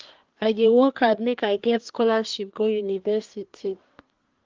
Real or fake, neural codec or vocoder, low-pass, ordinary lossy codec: fake; codec, 24 kHz, 1 kbps, SNAC; 7.2 kHz; Opus, 32 kbps